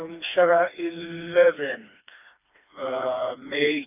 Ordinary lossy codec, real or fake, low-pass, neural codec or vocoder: none; fake; 3.6 kHz; codec, 16 kHz, 2 kbps, FreqCodec, smaller model